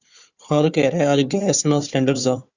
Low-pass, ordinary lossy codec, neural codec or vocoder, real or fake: 7.2 kHz; Opus, 64 kbps; codec, 16 kHz, 4 kbps, FunCodec, trained on Chinese and English, 50 frames a second; fake